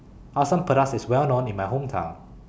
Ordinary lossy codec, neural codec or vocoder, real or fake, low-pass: none; none; real; none